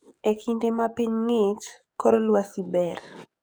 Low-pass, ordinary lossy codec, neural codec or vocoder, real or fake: none; none; codec, 44.1 kHz, 7.8 kbps, DAC; fake